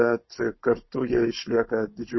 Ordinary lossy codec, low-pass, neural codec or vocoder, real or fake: MP3, 24 kbps; 7.2 kHz; codec, 16 kHz, 16 kbps, FunCodec, trained on Chinese and English, 50 frames a second; fake